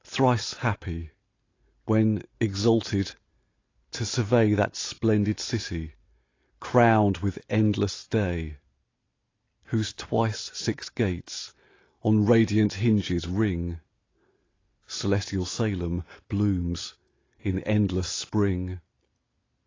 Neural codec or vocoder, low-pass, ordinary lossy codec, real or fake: none; 7.2 kHz; AAC, 32 kbps; real